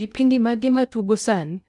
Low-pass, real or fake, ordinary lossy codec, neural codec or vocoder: 10.8 kHz; fake; none; codec, 16 kHz in and 24 kHz out, 0.6 kbps, FocalCodec, streaming, 2048 codes